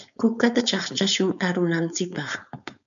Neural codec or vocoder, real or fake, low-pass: codec, 16 kHz, 4.8 kbps, FACodec; fake; 7.2 kHz